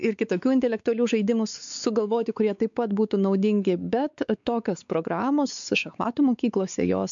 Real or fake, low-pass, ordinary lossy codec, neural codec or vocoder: fake; 7.2 kHz; MP3, 64 kbps; codec, 16 kHz, 4 kbps, X-Codec, WavLM features, trained on Multilingual LibriSpeech